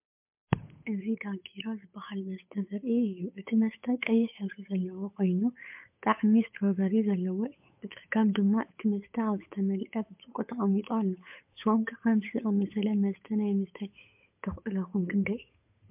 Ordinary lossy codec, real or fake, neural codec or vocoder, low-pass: MP3, 32 kbps; fake; codec, 16 kHz, 8 kbps, FunCodec, trained on Chinese and English, 25 frames a second; 3.6 kHz